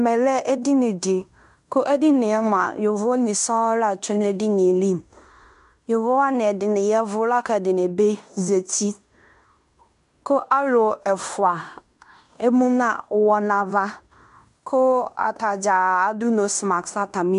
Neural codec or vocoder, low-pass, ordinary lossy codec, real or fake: codec, 16 kHz in and 24 kHz out, 0.9 kbps, LongCat-Audio-Codec, fine tuned four codebook decoder; 10.8 kHz; MP3, 96 kbps; fake